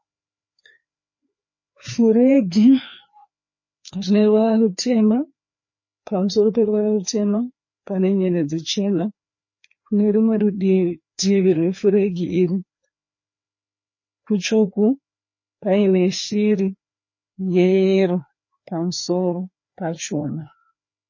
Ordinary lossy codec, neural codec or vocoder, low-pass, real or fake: MP3, 32 kbps; codec, 16 kHz, 2 kbps, FreqCodec, larger model; 7.2 kHz; fake